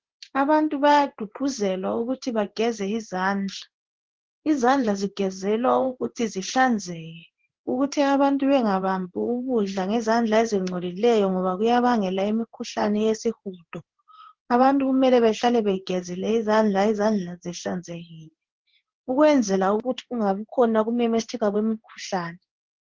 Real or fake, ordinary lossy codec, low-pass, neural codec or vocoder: fake; Opus, 16 kbps; 7.2 kHz; codec, 16 kHz in and 24 kHz out, 1 kbps, XY-Tokenizer